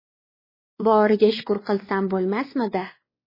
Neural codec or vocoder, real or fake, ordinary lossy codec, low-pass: codec, 16 kHz, 2 kbps, X-Codec, WavLM features, trained on Multilingual LibriSpeech; fake; MP3, 24 kbps; 5.4 kHz